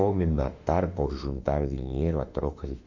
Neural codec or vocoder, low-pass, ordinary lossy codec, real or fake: autoencoder, 48 kHz, 32 numbers a frame, DAC-VAE, trained on Japanese speech; 7.2 kHz; none; fake